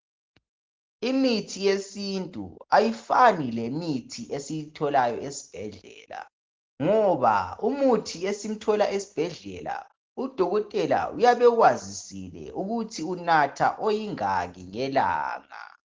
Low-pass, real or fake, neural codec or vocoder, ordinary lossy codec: 7.2 kHz; real; none; Opus, 16 kbps